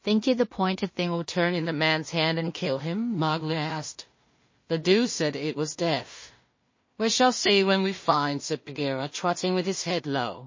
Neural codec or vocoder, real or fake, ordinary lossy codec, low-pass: codec, 16 kHz in and 24 kHz out, 0.4 kbps, LongCat-Audio-Codec, two codebook decoder; fake; MP3, 32 kbps; 7.2 kHz